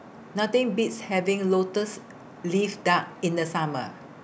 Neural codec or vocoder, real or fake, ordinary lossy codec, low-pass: none; real; none; none